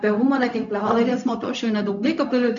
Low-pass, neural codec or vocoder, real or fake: 7.2 kHz; codec, 16 kHz, 0.4 kbps, LongCat-Audio-Codec; fake